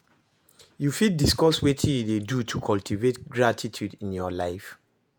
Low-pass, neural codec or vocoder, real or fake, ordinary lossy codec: none; vocoder, 48 kHz, 128 mel bands, Vocos; fake; none